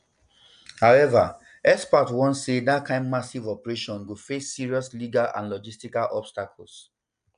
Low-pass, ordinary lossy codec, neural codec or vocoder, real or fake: 9.9 kHz; none; none; real